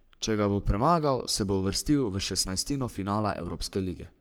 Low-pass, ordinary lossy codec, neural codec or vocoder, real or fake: none; none; codec, 44.1 kHz, 3.4 kbps, Pupu-Codec; fake